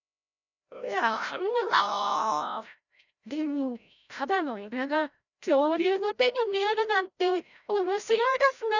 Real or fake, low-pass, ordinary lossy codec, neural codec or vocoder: fake; 7.2 kHz; none; codec, 16 kHz, 0.5 kbps, FreqCodec, larger model